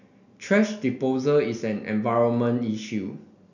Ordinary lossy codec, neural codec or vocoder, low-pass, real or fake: none; none; 7.2 kHz; real